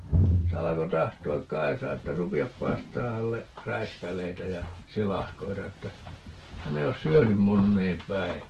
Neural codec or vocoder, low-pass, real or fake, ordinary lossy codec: none; 14.4 kHz; real; Opus, 16 kbps